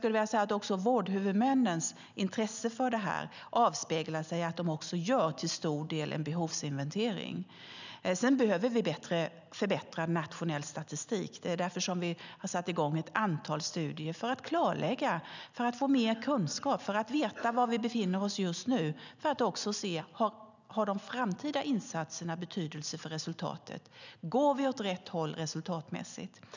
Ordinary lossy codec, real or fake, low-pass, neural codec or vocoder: none; real; 7.2 kHz; none